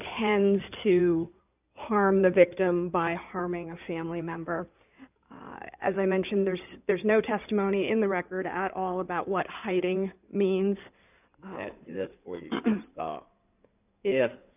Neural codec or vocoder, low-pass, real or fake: codec, 16 kHz in and 24 kHz out, 2.2 kbps, FireRedTTS-2 codec; 3.6 kHz; fake